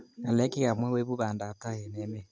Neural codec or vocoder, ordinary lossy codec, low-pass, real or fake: none; none; none; real